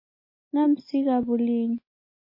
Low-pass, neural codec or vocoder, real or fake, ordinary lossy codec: 5.4 kHz; none; real; MP3, 24 kbps